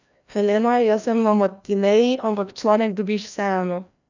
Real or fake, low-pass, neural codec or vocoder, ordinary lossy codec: fake; 7.2 kHz; codec, 16 kHz, 1 kbps, FreqCodec, larger model; none